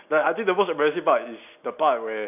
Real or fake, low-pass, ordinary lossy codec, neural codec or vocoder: real; 3.6 kHz; none; none